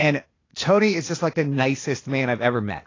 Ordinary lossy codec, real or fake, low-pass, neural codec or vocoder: AAC, 32 kbps; fake; 7.2 kHz; codec, 16 kHz, 0.8 kbps, ZipCodec